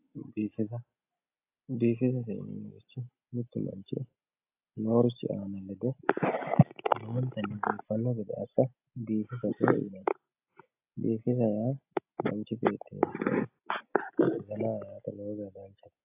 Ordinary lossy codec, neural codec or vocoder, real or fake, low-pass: AAC, 32 kbps; none; real; 3.6 kHz